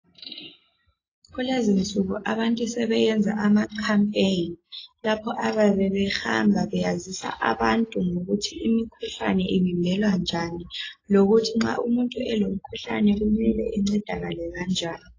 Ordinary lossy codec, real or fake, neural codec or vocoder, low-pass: AAC, 32 kbps; real; none; 7.2 kHz